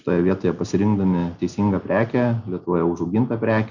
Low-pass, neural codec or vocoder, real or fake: 7.2 kHz; none; real